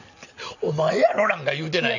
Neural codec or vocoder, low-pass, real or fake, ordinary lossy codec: none; 7.2 kHz; real; none